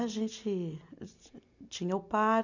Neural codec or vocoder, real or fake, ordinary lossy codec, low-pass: none; real; none; 7.2 kHz